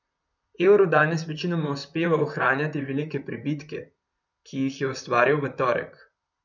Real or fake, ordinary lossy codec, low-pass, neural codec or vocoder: fake; none; 7.2 kHz; vocoder, 44.1 kHz, 128 mel bands, Pupu-Vocoder